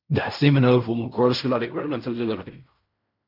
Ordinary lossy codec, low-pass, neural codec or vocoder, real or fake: MP3, 48 kbps; 5.4 kHz; codec, 16 kHz in and 24 kHz out, 0.4 kbps, LongCat-Audio-Codec, fine tuned four codebook decoder; fake